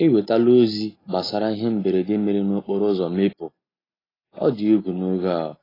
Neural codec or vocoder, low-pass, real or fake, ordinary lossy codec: none; 5.4 kHz; real; AAC, 24 kbps